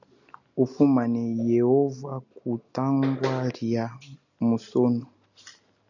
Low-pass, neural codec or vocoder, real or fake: 7.2 kHz; none; real